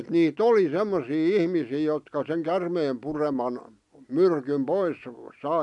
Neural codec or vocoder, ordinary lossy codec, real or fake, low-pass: none; none; real; 10.8 kHz